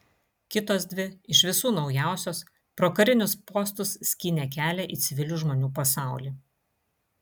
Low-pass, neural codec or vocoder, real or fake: 19.8 kHz; none; real